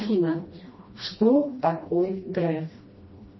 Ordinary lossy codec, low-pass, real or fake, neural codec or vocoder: MP3, 24 kbps; 7.2 kHz; fake; codec, 16 kHz, 1 kbps, FreqCodec, smaller model